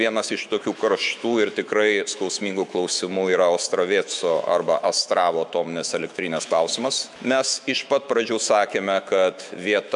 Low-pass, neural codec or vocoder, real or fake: 10.8 kHz; autoencoder, 48 kHz, 128 numbers a frame, DAC-VAE, trained on Japanese speech; fake